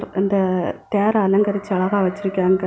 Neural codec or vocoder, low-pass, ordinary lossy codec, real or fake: none; none; none; real